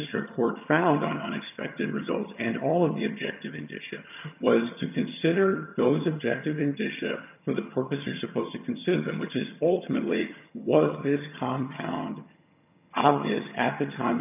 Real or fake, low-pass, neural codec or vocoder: fake; 3.6 kHz; vocoder, 22.05 kHz, 80 mel bands, HiFi-GAN